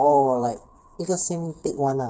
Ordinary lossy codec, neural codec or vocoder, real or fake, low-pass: none; codec, 16 kHz, 4 kbps, FreqCodec, smaller model; fake; none